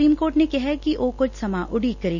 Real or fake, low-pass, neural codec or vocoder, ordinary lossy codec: real; 7.2 kHz; none; none